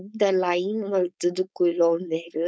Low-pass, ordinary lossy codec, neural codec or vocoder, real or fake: none; none; codec, 16 kHz, 4.8 kbps, FACodec; fake